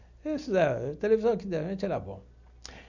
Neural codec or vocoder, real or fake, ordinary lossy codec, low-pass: none; real; none; 7.2 kHz